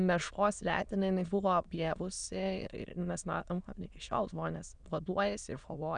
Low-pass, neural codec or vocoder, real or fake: 9.9 kHz; autoencoder, 22.05 kHz, a latent of 192 numbers a frame, VITS, trained on many speakers; fake